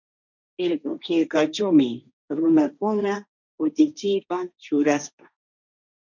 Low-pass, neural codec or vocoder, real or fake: 7.2 kHz; codec, 16 kHz, 1.1 kbps, Voila-Tokenizer; fake